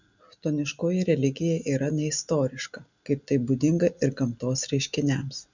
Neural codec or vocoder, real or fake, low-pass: none; real; 7.2 kHz